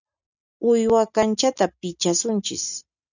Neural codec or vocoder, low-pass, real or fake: none; 7.2 kHz; real